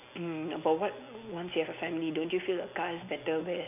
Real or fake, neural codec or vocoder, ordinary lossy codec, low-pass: real; none; none; 3.6 kHz